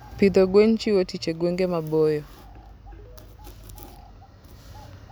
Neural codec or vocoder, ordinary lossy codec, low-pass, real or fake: none; none; none; real